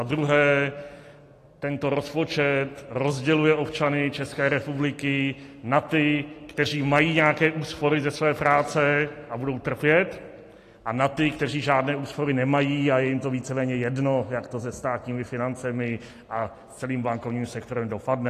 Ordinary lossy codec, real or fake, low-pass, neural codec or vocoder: AAC, 48 kbps; real; 14.4 kHz; none